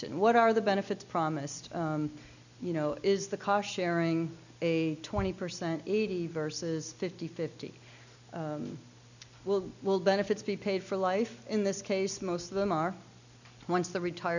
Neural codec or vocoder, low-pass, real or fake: none; 7.2 kHz; real